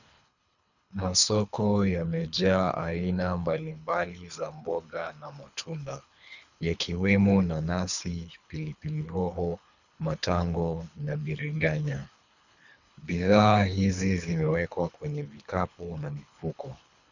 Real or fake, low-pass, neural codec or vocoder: fake; 7.2 kHz; codec, 24 kHz, 3 kbps, HILCodec